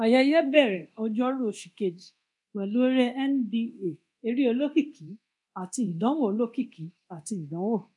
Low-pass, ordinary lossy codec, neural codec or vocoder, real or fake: 10.8 kHz; none; codec, 24 kHz, 0.9 kbps, DualCodec; fake